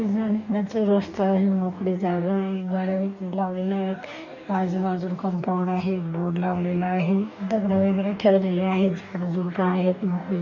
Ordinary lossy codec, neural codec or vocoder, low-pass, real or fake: none; codec, 44.1 kHz, 2.6 kbps, DAC; 7.2 kHz; fake